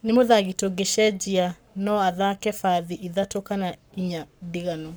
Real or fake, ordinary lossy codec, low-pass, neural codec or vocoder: fake; none; none; codec, 44.1 kHz, 7.8 kbps, Pupu-Codec